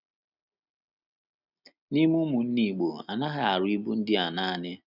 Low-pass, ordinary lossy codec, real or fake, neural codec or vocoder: 5.4 kHz; none; real; none